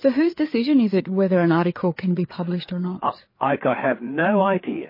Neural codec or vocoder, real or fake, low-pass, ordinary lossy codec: codec, 16 kHz, 4 kbps, FreqCodec, larger model; fake; 5.4 kHz; MP3, 24 kbps